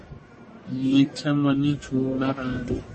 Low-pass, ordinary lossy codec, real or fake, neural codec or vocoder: 10.8 kHz; MP3, 32 kbps; fake; codec, 44.1 kHz, 1.7 kbps, Pupu-Codec